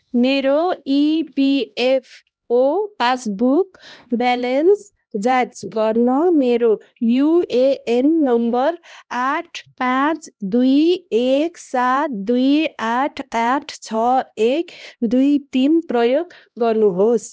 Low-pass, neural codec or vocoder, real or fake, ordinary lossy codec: none; codec, 16 kHz, 1 kbps, X-Codec, HuBERT features, trained on LibriSpeech; fake; none